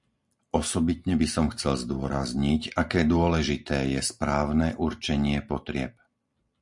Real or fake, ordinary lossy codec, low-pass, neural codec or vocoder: real; MP3, 64 kbps; 10.8 kHz; none